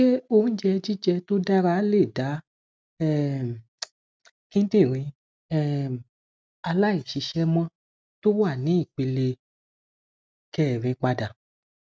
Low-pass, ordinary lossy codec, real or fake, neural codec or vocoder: none; none; real; none